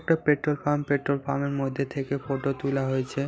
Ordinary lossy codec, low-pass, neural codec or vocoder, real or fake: none; none; none; real